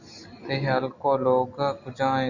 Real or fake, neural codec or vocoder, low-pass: real; none; 7.2 kHz